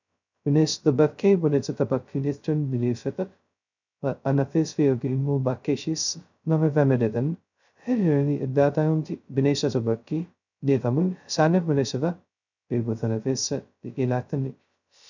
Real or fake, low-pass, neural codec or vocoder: fake; 7.2 kHz; codec, 16 kHz, 0.2 kbps, FocalCodec